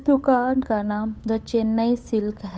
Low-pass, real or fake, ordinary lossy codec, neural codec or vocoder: none; fake; none; codec, 16 kHz, 8 kbps, FunCodec, trained on Chinese and English, 25 frames a second